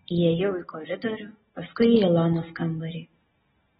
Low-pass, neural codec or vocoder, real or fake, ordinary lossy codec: 19.8 kHz; none; real; AAC, 16 kbps